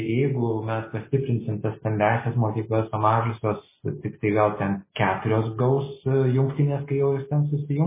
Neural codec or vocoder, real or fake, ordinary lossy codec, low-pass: none; real; MP3, 16 kbps; 3.6 kHz